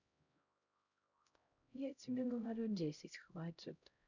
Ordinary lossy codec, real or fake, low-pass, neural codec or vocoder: none; fake; 7.2 kHz; codec, 16 kHz, 0.5 kbps, X-Codec, HuBERT features, trained on LibriSpeech